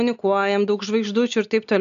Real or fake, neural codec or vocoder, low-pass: real; none; 7.2 kHz